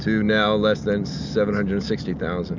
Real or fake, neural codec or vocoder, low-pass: real; none; 7.2 kHz